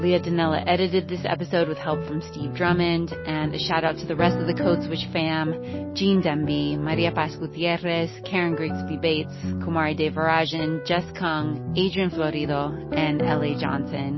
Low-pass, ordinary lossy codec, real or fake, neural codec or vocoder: 7.2 kHz; MP3, 24 kbps; real; none